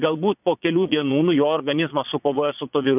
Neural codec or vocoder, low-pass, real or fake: vocoder, 22.05 kHz, 80 mel bands, Vocos; 3.6 kHz; fake